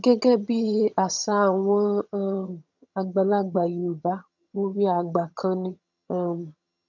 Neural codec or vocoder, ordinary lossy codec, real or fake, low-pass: vocoder, 22.05 kHz, 80 mel bands, HiFi-GAN; none; fake; 7.2 kHz